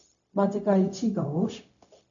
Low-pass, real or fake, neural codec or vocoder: 7.2 kHz; fake; codec, 16 kHz, 0.4 kbps, LongCat-Audio-Codec